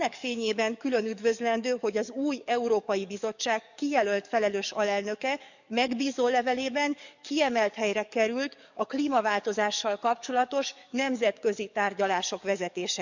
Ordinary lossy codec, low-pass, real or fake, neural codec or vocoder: none; 7.2 kHz; fake; codec, 44.1 kHz, 7.8 kbps, DAC